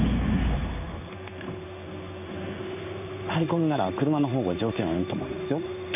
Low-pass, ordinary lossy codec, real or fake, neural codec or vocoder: 3.6 kHz; none; fake; codec, 16 kHz in and 24 kHz out, 1 kbps, XY-Tokenizer